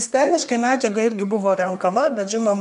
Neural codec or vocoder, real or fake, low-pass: codec, 24 kHz, 1 kbps, SNAC; fake; 10.8 kHz